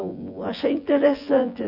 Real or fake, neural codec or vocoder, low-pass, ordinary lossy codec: fake; vocoder, 24 kHz, 100 mel bands, Vocos; 5.4 kHz; none